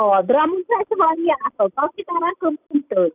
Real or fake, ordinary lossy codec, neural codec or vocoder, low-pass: real; none; none; 3.6 kHz